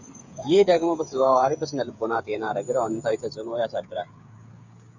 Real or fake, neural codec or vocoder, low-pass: fake; codec, 16 kHz, 8 kbps, FreqCodec, smaller model; 7.2 kHz